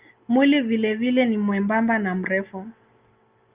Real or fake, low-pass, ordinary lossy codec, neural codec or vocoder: real; 3.6 kHz; Opus, 24 kbps; none